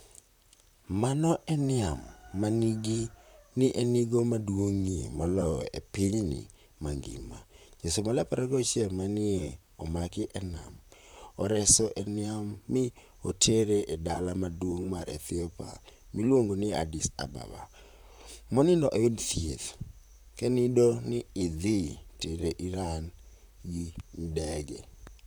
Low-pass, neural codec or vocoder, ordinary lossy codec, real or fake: none; vocoder, 44.1 kHz, 128 mel bands, Pupu-Vocoder; none; fake